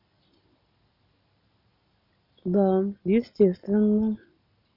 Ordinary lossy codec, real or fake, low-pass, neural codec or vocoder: none; real; 5.4 kHz; none